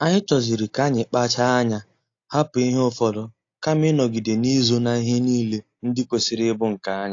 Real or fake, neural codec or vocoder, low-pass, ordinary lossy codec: real; none; 7.2 kHz; AAC, 48 kbps